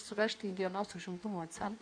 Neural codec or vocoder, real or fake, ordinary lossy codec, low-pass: codec, 16 kHz in and 24 kHz out, 1.1 kbps, FireRedTTS-2 codec; fake; MP3, 96 kbps; 9.9 kHz